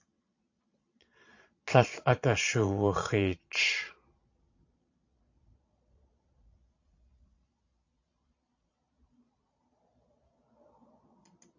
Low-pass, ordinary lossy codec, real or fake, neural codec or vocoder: 7.2 kHz; AAC, 48 kbps; fake; vocoder, 22.05 kHz, 80 mel bands, Vocos